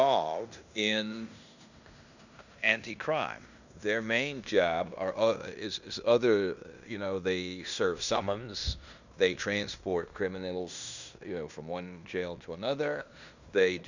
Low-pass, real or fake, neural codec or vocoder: 7.2 kHz; fake; codec, 16 kHz in and 24 kHz out, 0.9 kbps, LongCat-Audio-Codec, fine tuned four codebook decoder